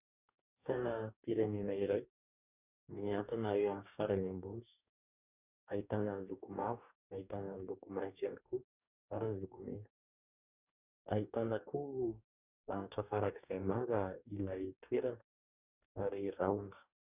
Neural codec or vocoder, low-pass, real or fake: codec, 44.1 kHz, 2.6 kbps, DAC; 3.6 kHz; fake